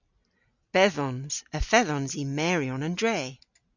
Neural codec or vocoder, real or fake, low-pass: none; real; 7.2 kHz